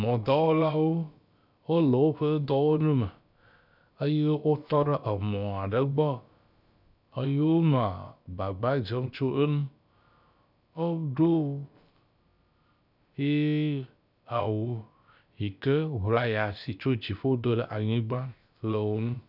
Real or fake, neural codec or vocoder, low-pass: fake; codec, 16 kHz, about 1 kbps, DyCAST, with the encoder's durations; 5.4 kHz